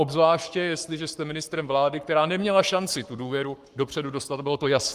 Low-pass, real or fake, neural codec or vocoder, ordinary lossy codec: 14.4 kHz; fake; codec, 44.1 kHz, 7.8 kbps, Pupu-Codec; Opus, 32 kbps